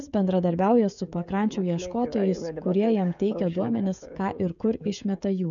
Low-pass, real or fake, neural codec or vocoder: 7.2 kHz; fake; codec, 16 kHz, 16 kbps, FreqCodec, smaller model